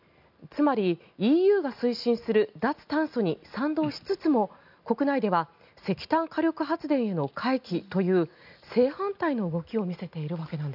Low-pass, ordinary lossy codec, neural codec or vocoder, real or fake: 5.4 kHz; none; none; real